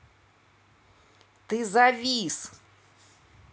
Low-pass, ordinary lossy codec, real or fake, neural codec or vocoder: none; none; real; none